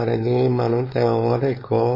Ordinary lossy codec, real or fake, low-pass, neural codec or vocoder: MP3, 24 kbps; fake; 5.4 kHz; codec, 16 kHz, 4.8 kbps, FACodec